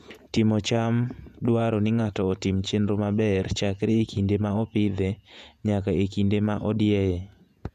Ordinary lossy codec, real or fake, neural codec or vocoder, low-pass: none; fake; vocoder, 48 kHz, 128 mel bands, Vocos; 14.4 kHz